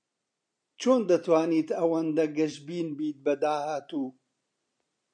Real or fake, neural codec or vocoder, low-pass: fake; vocoder, 44.1 kHz, 128 mel bands every 256 samples, BigVGAN v2; 9.9 kHz